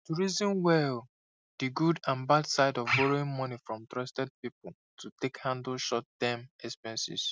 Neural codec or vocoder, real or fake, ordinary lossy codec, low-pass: none; real; none; none